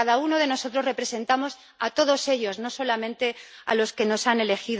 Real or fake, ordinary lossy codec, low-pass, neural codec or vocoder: real; none; none; none